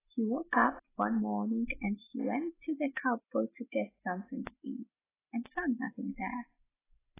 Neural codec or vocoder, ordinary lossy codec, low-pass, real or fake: none; AAC, 16 kbps; 3.6 kHz; real